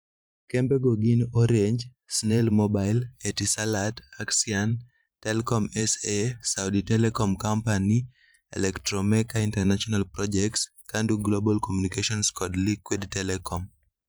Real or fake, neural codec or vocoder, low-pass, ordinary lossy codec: fake; vocoder, 44.1 kHz, 128 mel bands every 512 samples, BigVGAN v2; none; none